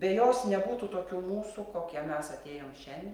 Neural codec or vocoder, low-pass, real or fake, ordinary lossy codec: none; 19.8 kHz; real; Opus, 32 kbps